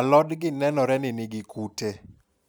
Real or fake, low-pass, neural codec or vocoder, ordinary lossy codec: real; none; none; none